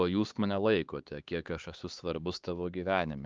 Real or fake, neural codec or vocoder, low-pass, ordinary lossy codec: fake; codec, 16 kHz, 4 kbps, X-Codec, HuBERT features, trained on LibriSpeech; 7.2 kHz; Opus, 24 kbps